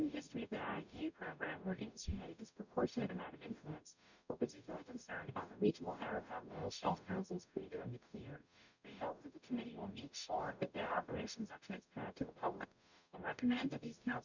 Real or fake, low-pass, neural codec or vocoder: fake; 7.2 kHz; codec, 44.1 kHz, 0.9 kbps, DAC